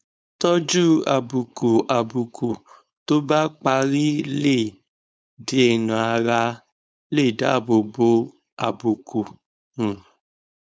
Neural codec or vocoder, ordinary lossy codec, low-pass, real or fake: codec, 16 kHz, 4.8 kbps, FACodec; none; none; fake